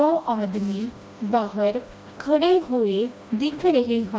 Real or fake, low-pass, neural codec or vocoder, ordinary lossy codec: fake; none; codec, 16 kHz, 1 kbps, FreqCodec, smaller model; none